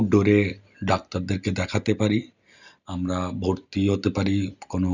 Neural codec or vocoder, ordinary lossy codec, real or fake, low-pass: none; none; real; 7.2 kHz